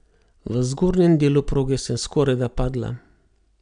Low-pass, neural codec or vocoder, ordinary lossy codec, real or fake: 9.9 kHz; none; none; real